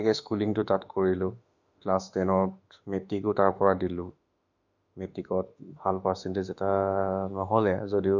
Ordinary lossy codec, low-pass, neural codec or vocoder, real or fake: none; 7.2 kHz; autoencoder, 48 kHz, 32 numbers a frame, DAC-VAE, trained on Japanese speech; fake